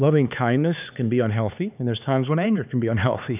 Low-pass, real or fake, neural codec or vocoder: 3.6 kHz; fake; codec, 16 kHz, 4 kbps, X-Codec, HuBERT features, trained on LibriSpeech